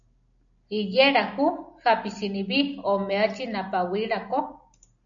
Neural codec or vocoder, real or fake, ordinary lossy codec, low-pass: none; real; AAC, 64 kbps; 7.2 kHz